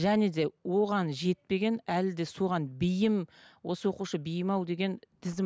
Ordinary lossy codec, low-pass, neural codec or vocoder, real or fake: none; none; none; real